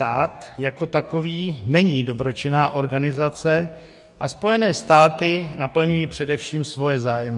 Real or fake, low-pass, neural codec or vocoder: fake; 10.8 kHz; codec, 44.1 kHz, 2.6 kbps, DAC